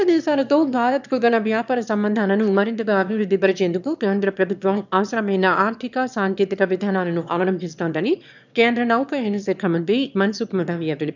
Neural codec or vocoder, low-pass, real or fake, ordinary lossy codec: autoencoder, 22.05 kHz, a latent of 192 numbers a frame, VITS, trained on one speaker; 7.2 kHz; fake; none